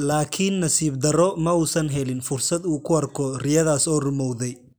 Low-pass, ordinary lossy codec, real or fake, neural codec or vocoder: none; none; real; none